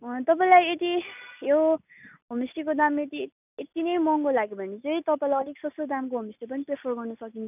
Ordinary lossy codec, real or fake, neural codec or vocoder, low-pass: none; real; none; 3.6 kHz